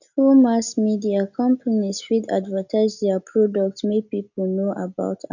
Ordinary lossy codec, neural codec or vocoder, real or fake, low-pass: none; none; real; 7.2 kHz